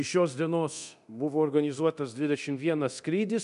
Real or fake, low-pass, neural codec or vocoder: fake; 10.8 kHz; codec, 24 kHz, 0.9 kbps, DualCodec